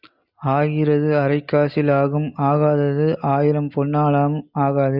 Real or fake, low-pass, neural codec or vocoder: real; 5.4 kHz; none